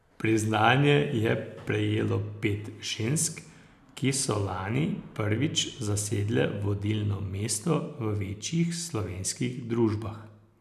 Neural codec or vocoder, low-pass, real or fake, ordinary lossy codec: none; 14.4 kHz; real; none